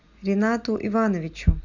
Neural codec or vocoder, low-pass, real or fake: none; 7.2 kHz; real